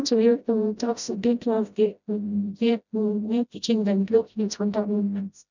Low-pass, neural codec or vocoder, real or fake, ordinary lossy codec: 7.2 kHz; codec, 16 kHz, 0.5 kbps, FreqCodec, smaller model; fake; none